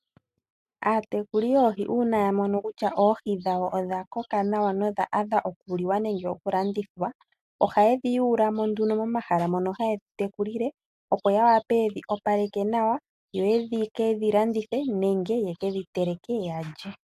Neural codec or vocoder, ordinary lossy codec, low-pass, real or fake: none; AAC, 96 kbps; 14.4 kHz; real